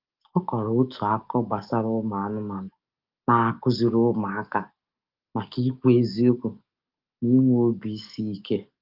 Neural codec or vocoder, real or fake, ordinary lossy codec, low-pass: codec, 44.1 kHz, 7.8 kbps, DAC; fake; Opus, 24 kbps; 5.4 kHz